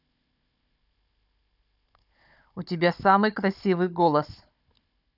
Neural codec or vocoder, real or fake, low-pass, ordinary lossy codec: codec, 16 kHz, 16 kbps, FunCodec, trained on Chinese and English, 50 frames a second; fake; 5.4 kHz; none